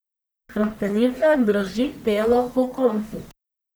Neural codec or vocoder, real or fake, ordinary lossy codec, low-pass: codec, 44.1 kHz, 1.7 kbps, Pupu-Codec; fake; none; none